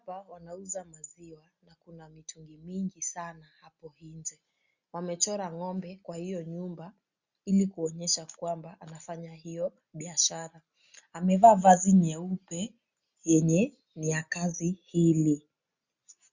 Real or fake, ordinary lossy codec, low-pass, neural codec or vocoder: real; Opus, 64 kbps; 7.2 kHz; none